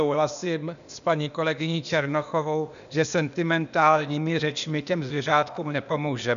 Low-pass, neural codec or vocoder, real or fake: 7.2 kHz; codec, 16 kHz, 0.8 kbps, ZipCodec; fake